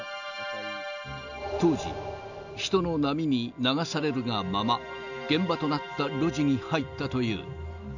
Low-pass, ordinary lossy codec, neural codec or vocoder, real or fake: 7.2 kHz; none; none; real